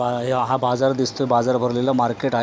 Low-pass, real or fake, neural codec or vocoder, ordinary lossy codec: none; real; none; none